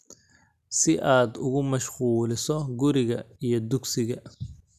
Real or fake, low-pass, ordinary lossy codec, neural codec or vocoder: real; 14.4 kHz; none; none